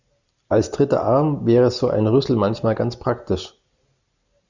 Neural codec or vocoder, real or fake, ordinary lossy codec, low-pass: none; real; Opus, 64 kbps; 7.2 kHz